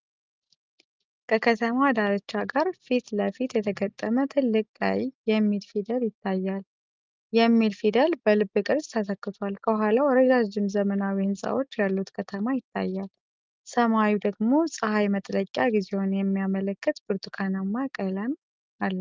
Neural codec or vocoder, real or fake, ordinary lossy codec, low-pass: none; real; Opus, 24 kbps; 7.2 kHz